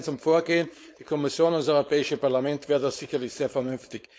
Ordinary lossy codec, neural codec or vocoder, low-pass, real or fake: none; codec, 16 kHz, 4.8 kbps, FACodec; none; fake